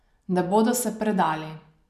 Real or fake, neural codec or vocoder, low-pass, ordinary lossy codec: real; none; 14.4 kHz; none